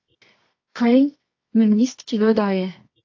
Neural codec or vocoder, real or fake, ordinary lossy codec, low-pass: codec, 24 kHz, 0.9 kbps, WavTokenizer, medium music audio release; fake; AAC, 48 kbps; 7.2 kHz